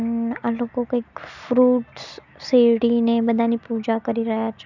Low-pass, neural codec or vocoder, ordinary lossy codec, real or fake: 7.2 kHz; none; none; real